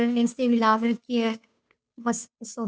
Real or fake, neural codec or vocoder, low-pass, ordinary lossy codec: fake; codec, 16 kHz, 2 kbps, FunCodec, trained on Chinese and English, 25 frames a second; none; none